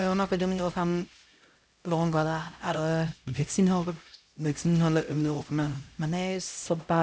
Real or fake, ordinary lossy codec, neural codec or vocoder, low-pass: fake; none; codec, 16 kHz, 0.5 kbps, X-Codec, HuBERT features, trained on LibriSpeech; none